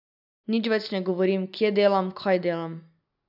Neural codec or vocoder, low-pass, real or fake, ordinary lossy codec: none; 5.4 kHz; real; none